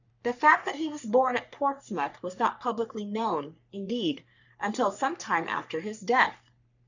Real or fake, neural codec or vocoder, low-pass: fake; codec, 44.1 kHz, 3.4 kbps, Pupu-Codec; 7.2 kHz